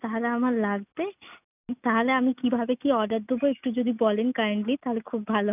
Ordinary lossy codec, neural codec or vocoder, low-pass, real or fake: none; none; 3.6 kHz; real